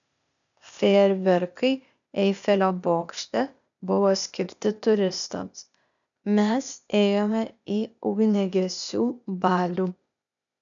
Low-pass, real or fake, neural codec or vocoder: 7.2 kHz; fake; codec, 16 kHz, 0.8 kbps, ZipCodec